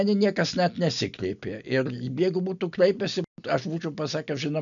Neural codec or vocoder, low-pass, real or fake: none; 7.2 kHz; real